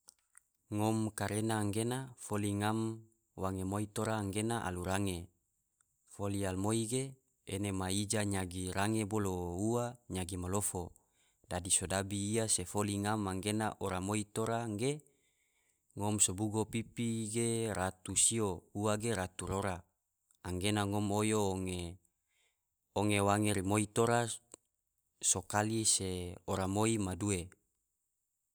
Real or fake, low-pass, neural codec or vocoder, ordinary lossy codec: real; none; none; none